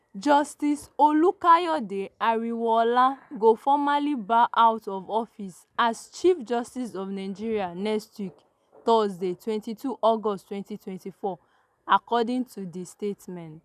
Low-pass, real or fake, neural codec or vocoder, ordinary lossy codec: 14.4 kHz; real; none; none